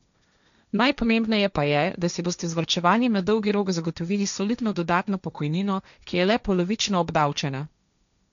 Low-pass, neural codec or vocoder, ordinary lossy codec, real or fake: 7.2 kHz; codec, 16 kHz, 1.1 kbps, Voila-Tokenizer; none; fake